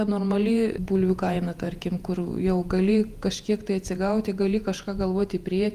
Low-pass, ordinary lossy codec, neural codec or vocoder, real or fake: 14.4 kHz; Opus, 32 kbps; vocoder, 48 kHz, 128 mel bands, Vocos; fake